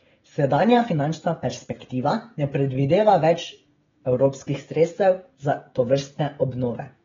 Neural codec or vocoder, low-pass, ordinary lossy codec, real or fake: codec, 16 kHz, 16 kbps, FreqCodec, smaller model; 7.2 kHz; AAC, 24 kbps; fake